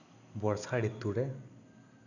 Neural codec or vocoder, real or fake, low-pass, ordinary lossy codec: none; real; 7.2 kHz; none